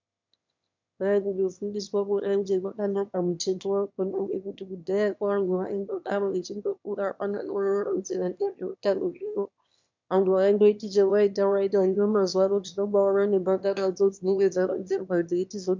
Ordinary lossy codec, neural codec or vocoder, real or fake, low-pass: MP3, 64 kbps; autoencoder, 22.05 kHz, a latent of 192 numbers a frame, VITS, trained on one speaker; fake; 7.2 kHz